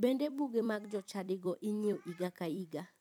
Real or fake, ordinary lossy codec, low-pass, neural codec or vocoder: fake; none; 19.8 kHz; vocoder, 44.1 kHz, 128 mel bands every 256 samples, BigVGAN v2